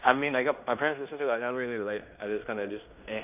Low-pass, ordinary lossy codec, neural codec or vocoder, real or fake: 3.6 kHz; none; codec, 16 kHz in and 24 kHz out, 0.9 kbps, LongCat-Audio-Codec, fine tuned four codebook decoder; fake